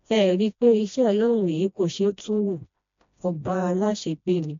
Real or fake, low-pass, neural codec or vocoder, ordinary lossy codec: fake; 7.2 kHz; codec, 16 kHz, 1 kbps, FreqCodec, smaller model; MP3, 64 kbps